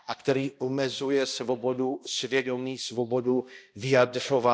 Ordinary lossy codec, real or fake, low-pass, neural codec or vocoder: none; fake; none; codec, 16 kHz, 1 kbps, X-Codec, HuBERT features, trained on balanced general audio